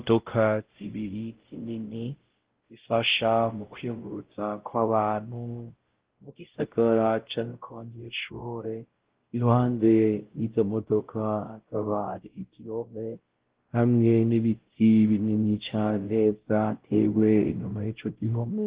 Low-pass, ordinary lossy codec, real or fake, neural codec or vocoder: 3.6 kHz; Opus, 16 kbps; fake; codec, 16 kHz, 0.5 kbps, X-Codec, WavLM features, trained on Multilingual LibriSpeech